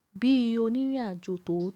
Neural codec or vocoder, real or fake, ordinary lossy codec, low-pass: codec, 44.1 kHz, 7.8 kbps, DAC; fake; none; 19.8 kHz